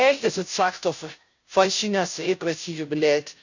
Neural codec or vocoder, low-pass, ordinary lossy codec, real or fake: codec, 16 kHz, 0.5 kbps, FunCodec, trained on Chinese and English, 25 frames a second; 7.2 kHz; none; fake